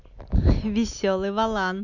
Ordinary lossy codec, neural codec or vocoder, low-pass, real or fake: none; none; 7.2 kHz; real